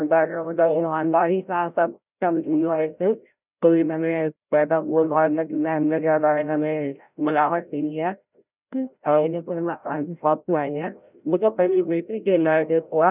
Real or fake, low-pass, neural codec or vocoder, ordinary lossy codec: fake; 3.6 kHz; codec, 16 kHz, 0.5 kbps, FreqCodec, larger model; none